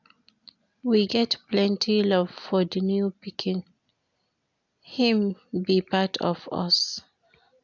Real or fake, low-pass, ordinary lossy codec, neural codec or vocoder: real; 7.2 kHz; none; none